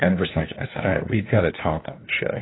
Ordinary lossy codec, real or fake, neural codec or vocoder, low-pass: AAC, 16 kbps; fake; codec, 16 kHz, 1 kbps, FunCodec, trained on LibriTTS, 50 frames a second; 7.2 kHz